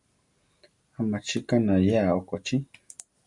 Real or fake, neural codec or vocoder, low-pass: real; none; 10.8 kHz